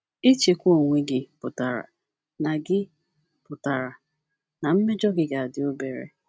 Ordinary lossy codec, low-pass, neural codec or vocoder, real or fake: none; none; none; real